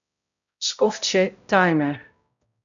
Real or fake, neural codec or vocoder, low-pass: fake; codec, 16 kHz, 0.5 kbps, X-Codec, HuBERT features, trained on balanced general audio; 7.2 kHz